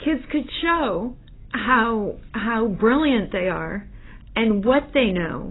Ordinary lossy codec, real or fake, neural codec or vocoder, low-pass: AAC, 16 kbps; real; none; 7.2 kHz